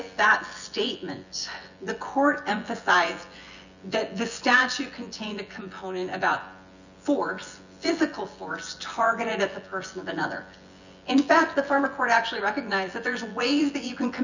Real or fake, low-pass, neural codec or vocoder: fake; 7.2 kHz; vocoder, 24 kHz, 100 mel bands, Vocos